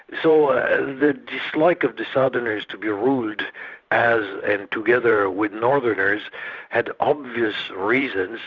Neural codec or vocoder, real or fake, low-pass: vocoder, 44.1 kHz, 128 mel bands every 512 samples, BigVGAN v2; fake; 7.2 kHz